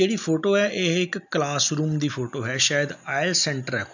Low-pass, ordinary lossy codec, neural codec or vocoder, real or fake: 7.2 kHz; none; none; real